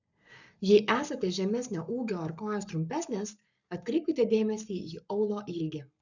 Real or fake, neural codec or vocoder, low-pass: fake; codec, 44.1 kHz, 7.8 kbps, Pupu-Codec; 7.2 kHz